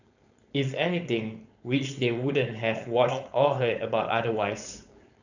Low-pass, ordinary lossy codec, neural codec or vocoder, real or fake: 7.2 kHz; none; codec, 16 kHz, 4.8 kbps, FACodec; fake